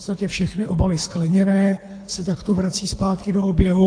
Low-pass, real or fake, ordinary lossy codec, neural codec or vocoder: 9.9 kHz; fake; AAC, 48 kbps; codec, 24 kHz, 3 kbps, HILCodec